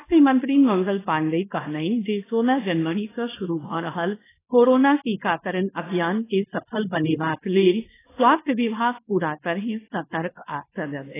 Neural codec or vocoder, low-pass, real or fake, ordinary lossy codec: codec, 24 kHz, 0.9 kbps, WavTokenizer, small release; 3.6 kHz; fake; AAC, 16 kbps